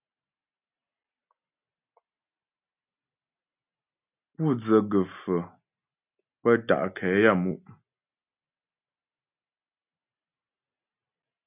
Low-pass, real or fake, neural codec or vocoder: 3.6 kHz; real; none